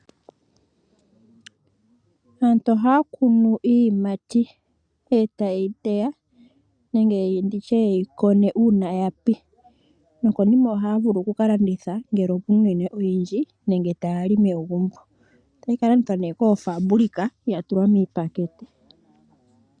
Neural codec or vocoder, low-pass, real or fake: none; 9.9 kHz; real